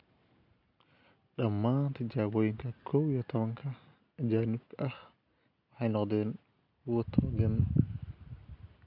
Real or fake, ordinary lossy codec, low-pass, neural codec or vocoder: real; none; 5.4 kHz; none